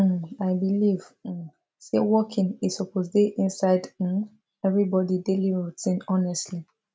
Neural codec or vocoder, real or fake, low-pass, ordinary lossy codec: none; real; none; none